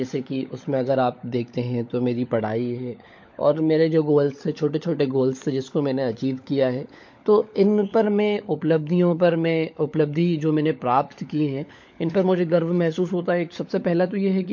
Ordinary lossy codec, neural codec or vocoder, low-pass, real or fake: MP3, 48 kbps; codec, 16 kHz, 16 kbps, FunCodec, trained on LibriTTS, 50 frames a second; 7.2 kHz; fake